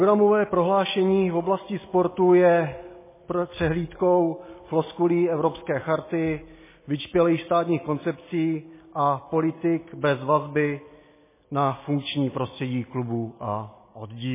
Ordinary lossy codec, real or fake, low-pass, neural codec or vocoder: MP3, 16 kbps; real; 3.6 kHz; none